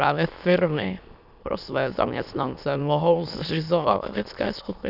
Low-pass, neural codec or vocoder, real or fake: 5.4 kHz; autoencoder, 22.05 kHz, a latent of 192 numbers a frame, VITS, trained on many speakers; fake